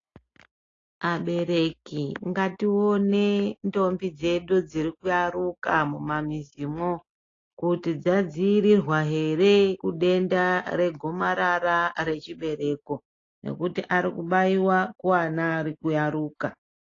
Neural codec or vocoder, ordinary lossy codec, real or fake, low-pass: none; AAC, 32 kbps; real; 7.2 kHz